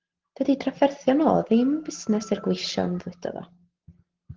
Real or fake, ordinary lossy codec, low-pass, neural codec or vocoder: real; Opus, 16 kbps; 7.2 kHz; none